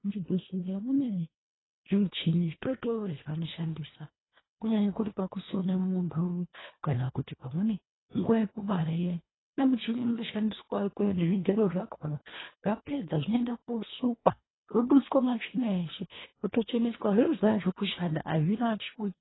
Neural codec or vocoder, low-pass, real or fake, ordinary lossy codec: codec, 24 kHz, 1.5 kbps, HILCodec; 7.2 kHz; fake; AAC, 16 kbps